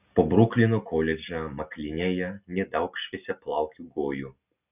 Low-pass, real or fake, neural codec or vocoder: 3.6 kHz; real; none